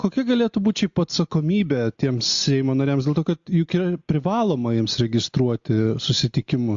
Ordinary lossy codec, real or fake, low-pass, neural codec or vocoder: AAC, 64 kbps; real; 7.2 kHz; none